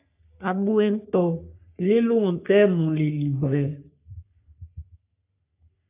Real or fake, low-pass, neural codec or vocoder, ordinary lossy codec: fake; 3.6 kHz; codec, 44.1 kHz, 3.4 kbps, Pupu-Codec; AAC, 24 kbps